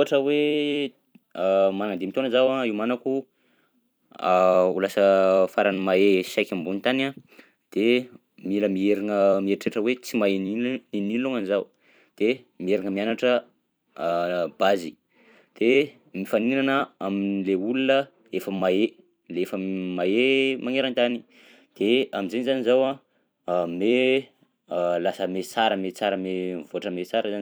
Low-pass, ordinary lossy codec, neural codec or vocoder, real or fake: none; none; vocoder, 44.1 kHz, 128 mel bands every 256 samples, BigVGAN v2; fake